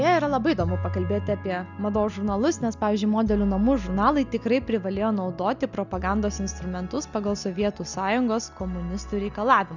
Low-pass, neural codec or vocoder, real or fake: 7.2 kHz; none; real